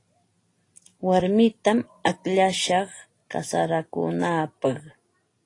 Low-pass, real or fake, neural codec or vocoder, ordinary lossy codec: 10.8 kHz; real; none; AAC, 32 kbps